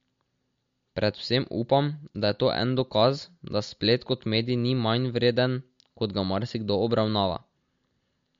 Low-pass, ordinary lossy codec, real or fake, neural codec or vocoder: 7.2 kHz; MP3, 48 kbps; real; none